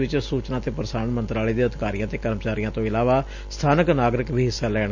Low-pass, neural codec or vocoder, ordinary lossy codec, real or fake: 7.2 kHz; none; none; real